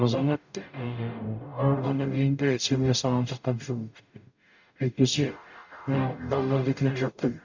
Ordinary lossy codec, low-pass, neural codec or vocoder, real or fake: none; 7.2 kHz; codec, 44.1 kHz, 0.9 kbps, DAC; fake